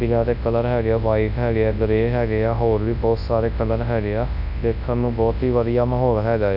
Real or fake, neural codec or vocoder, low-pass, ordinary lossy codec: fake; codec, 24 kHz, 0.9 kbps, WavTokenizer, large speech release; 5.4 kHz; none